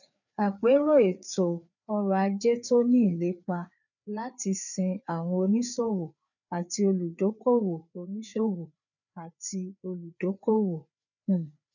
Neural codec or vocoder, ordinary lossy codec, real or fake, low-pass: codec, 16 kHz, 4 kbps, FreqCodec, larger model; none; fake; 7.2 kHz